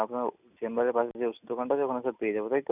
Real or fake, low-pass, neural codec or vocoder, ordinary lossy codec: real; 3.6 kHz; none; none